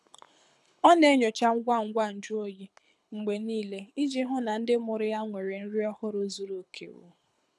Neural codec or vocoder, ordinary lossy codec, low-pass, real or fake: codec, 24 kHz, 6 kbps, HILCodec; none; none; fake